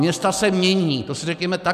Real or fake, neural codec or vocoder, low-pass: real; none; 14.4 kHz